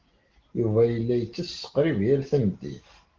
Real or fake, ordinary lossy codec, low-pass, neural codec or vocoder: real; Opus, 16 kbps; 7.2 kHz; none